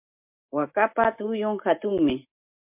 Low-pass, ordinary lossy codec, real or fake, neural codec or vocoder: 3.6 kHz; MP3, 32 kbps; real; none